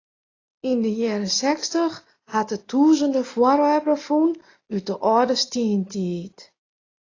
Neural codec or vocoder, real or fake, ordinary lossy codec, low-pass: none; real; AAC, 32 kbps; 7.2 kHz